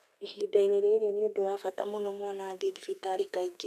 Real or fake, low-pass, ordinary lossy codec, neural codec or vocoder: fake; 14.4 kHz; none; codec, 32 kHz, 1.9 kbps, SNAC